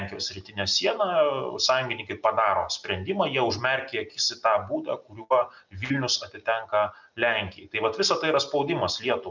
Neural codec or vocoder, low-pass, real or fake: none; 7.2 kHz; real